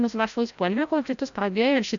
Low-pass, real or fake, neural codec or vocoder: 7.2 kHz; fake; codec, 16 kHz, 0.5 kbps, FreqCodec, larger model